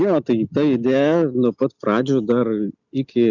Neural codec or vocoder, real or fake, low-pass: none; real; 7.2 kHz